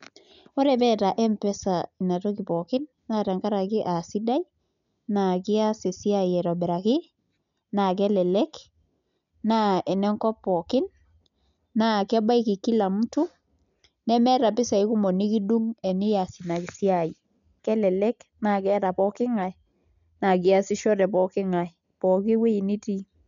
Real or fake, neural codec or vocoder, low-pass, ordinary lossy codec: real; none; 7.2 kHz; none